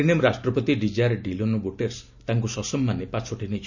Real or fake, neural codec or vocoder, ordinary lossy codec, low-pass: real; none; none; 7.2 kHz